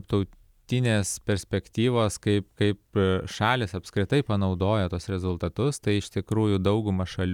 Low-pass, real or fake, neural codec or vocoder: 19.8 kHz; real; none